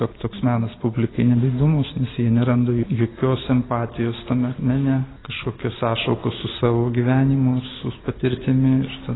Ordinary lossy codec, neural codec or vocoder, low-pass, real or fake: AAC, 16 kbps; none; 7.2 kHz; real